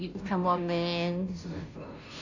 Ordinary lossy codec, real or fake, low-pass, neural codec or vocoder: none; fake; 7.2 kHz; codec, 16 kHz, 0.5 kbps, FunCodec, trained on Chinese and English, 25 frames a second